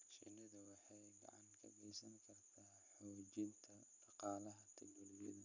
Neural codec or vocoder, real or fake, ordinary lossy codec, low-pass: none; real; none; 7.2 kHz